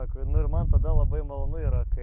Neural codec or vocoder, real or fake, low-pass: autoencoder, 48 kHz, 128 numbers a frame, DAC-VAE, trained on Japanese speech; fake; 3.6 kHz